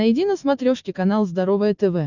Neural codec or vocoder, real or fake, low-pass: none; real; 7.2 kHz